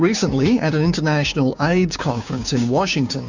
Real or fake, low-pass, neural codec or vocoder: fake; 7.2 kHz; codec, 16 kHz, 6 kbps, DAC